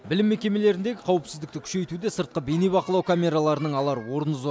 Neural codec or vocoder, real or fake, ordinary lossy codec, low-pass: none; real; none; none